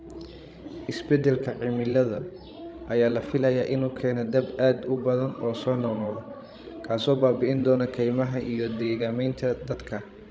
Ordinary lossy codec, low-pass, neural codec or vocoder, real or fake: none; none; codec, 16 kHz, 16 kbps, FreqCodec, larger model; fake